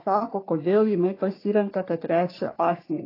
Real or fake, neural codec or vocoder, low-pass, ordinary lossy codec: fake; codec, 44.1 kHz, 3.4 kbps, Pupu-Codec; 5.4 kHz; AAC, 24 kbps